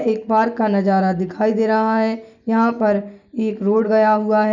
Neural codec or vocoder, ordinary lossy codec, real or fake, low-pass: none; none; real; 7.2 kHz